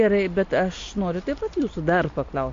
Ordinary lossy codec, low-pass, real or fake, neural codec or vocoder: AAC, 48 kbps; 7.2 kHz; real; none